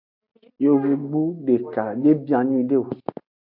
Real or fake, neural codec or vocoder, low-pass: real; none; 5.4 kHz